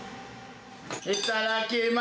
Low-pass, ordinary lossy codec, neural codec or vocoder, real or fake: none; none; none; real